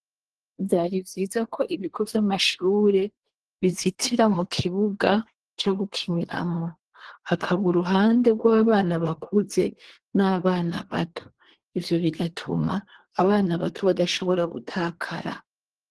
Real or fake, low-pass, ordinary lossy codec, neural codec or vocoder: fake; 10.8 kHz; Opus, 16 kbps; codec, 24 kHz, 1 kbps, SNAC